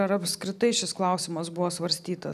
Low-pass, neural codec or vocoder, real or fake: 14.4 kHz; none; real